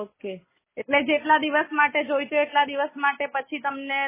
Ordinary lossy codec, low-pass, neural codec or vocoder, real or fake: MP3, 16 kbps; 3.6 kHz; none; real